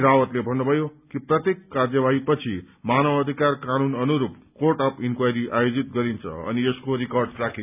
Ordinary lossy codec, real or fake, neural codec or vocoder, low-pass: none; real; none; 3.6 kHz